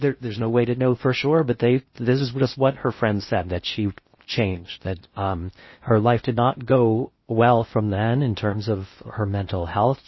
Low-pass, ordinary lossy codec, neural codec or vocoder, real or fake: 7.2 kHz; MP3, 24 kbps; codec, 16 kHz in and 24 kHz out, 0.6 kbps, FocalCodec, streaming, 2048 codes; fake